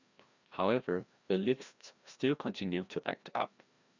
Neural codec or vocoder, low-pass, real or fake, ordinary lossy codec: codec, 16 kHz, 1 kbps, FreqCodec, larger model; 7.2 kHz; fake; Opus, 64 kbps